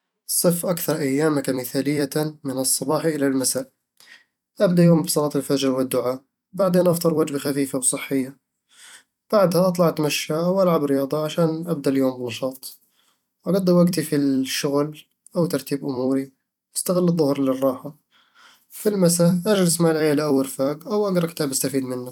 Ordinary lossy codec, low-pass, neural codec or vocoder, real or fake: none; 19.8 kHz; vocoder, 44.1 kHz, 128 mel bands every 256 samples, BigVGAN v2; fake